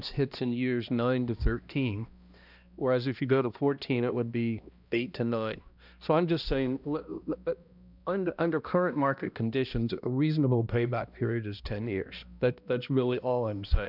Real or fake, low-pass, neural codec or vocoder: fake; 5.4 kHz; codec, 16 kHz, 1 kbps, X-Codec, HuBERT features, trained on balanced general audio